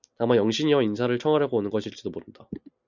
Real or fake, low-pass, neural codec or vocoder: real; 7.2 kHz; none